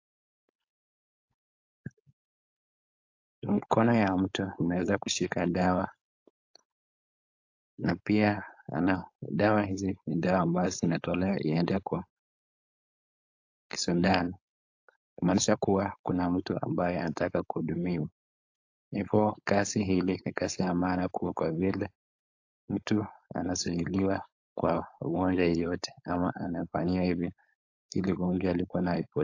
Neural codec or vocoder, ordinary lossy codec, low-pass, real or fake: codec, 16 kHz, 4.8 kbps, FACodec; AAC, 48 kbps; 7.2 kHz; fake